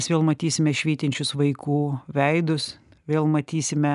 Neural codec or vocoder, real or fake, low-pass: none; real; 10.8 kHz